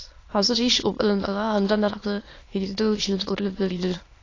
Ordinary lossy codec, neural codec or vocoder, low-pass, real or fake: AAC, 32 kbps; autoencoder, 22.05 kHz, a latent of 192 numbers a frame, VITS, trained on many speakers; 7.2 kHz; fake